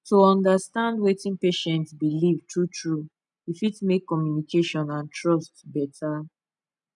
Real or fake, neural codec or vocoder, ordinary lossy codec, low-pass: real; none; none; 10.8 kHz